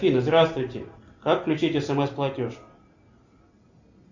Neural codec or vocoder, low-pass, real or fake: none; 7.2 kHz; real